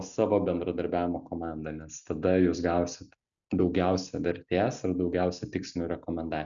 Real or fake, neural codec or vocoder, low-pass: real; none; 7.2 kHz